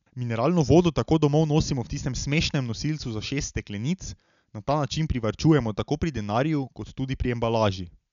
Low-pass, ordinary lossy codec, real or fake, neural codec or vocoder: 7.2 kHz; none; real; none